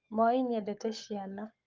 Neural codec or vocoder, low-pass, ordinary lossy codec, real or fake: codec, 44.1 kHz, 7.8 kbps, Pupu-Codec; 7.2 kHz; Opus, 24 kbps; fake